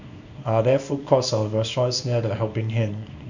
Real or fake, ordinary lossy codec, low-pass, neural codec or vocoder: fake; none; 7.2 kHz; codec, 24 kHz, 0.9 kbps, WavTokenizer, small release